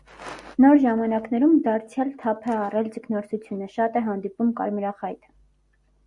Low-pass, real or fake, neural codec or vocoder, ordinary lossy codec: 10.8 kHz; real; none; Opus, 64 kbps